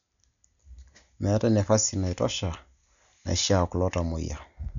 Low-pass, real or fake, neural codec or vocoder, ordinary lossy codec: 7.2 kHz; real; none; none